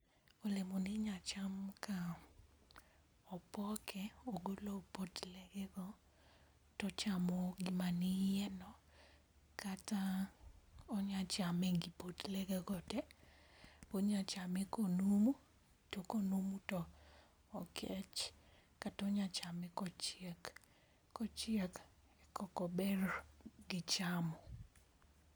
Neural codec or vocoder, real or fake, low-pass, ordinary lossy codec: none; real; none; none